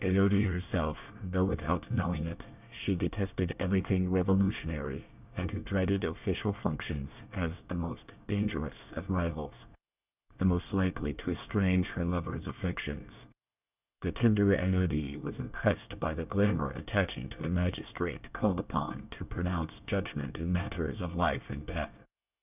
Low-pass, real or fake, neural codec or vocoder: 3.6 kHz; fake; codec, 24 kHz, 1 kbps, SNAC